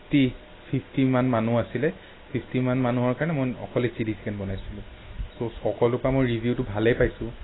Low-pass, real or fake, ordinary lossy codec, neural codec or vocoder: 7.2 kHz; real; AAC, 16 kbps; none